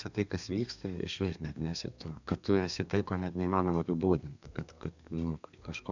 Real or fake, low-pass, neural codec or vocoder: fake; 7.2 kHz; codec, 32 kHz, 1.9 kbps, SNAC